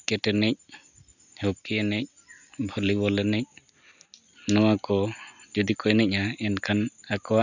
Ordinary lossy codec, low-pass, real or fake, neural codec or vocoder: none; 7.2 kHz; real; none